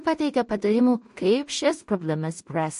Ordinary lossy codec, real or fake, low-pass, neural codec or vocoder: MP3, 48 kbps; fake; 10.8 kHz; codec, 16 kHz in and 24 kHz out, 0.4 kbps, LongCat-Audio-Codec, two codebook decoder